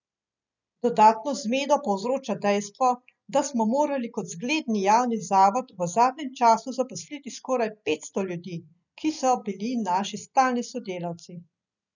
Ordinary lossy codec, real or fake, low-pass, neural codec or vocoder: none; real; 7.2 kHz; none